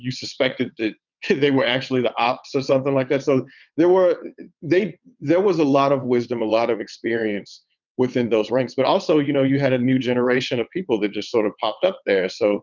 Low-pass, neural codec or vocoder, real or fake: 7.2 kHz; vocoder, 22.05 kHz, 80 mel bands, WaveNeXt; fake